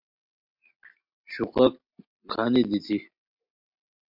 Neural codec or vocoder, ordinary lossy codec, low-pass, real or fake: none; MP3, 48 kbps; 5.4 kHz; real